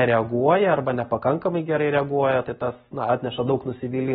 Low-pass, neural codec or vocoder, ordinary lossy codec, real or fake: 19.8 kHz; none; AAC, 16 kbps; real